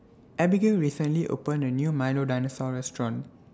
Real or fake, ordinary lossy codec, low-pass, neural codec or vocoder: real; none; none; none